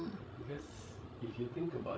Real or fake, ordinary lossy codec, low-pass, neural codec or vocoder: fake; none; none; codec, 16 kHz, 16 kbps, FreqCodec, larger model